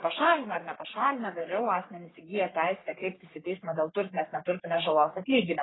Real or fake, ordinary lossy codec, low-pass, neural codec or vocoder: fake; AAC, 16 kbps; 7.2 kHz; codec, 44.1 kHz, 3.4 kbps, Pupu-Codec